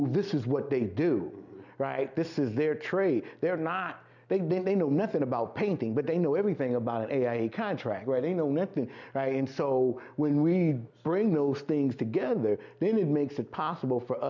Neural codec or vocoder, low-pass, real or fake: none; 7.2 kHz; real